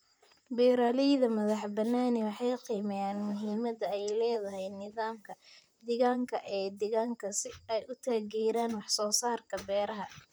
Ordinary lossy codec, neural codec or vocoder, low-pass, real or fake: none; vocoder, 44.1 kHz, 128 mel bands, Pupu-Vocoder; none; fake